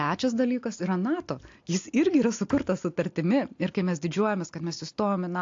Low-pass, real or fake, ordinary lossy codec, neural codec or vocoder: 7.2 kHz; real; AAC, 48 kbps; none